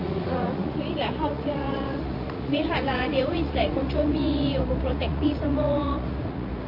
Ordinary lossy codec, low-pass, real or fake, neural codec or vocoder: MP3, 32 kbps; 5.4 kHz; fake; vocoder, 44.1 kHz, 80 mel bands, Vocos